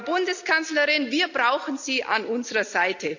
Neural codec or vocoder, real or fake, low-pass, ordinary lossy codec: none; real; 7.2 kHz; none